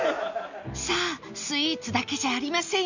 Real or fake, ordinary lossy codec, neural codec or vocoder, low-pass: real; none; none; 7.2 kHz